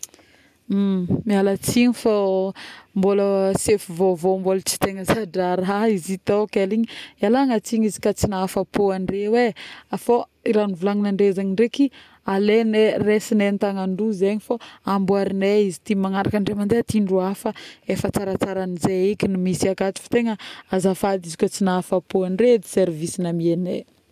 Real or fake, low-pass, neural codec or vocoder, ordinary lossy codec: real; 14.4 kHz; none; none